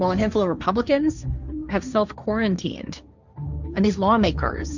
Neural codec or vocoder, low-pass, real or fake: codec, 16 kHz, 1.1 kbps, Voila-Tokenizer; 7.2 kHz; fake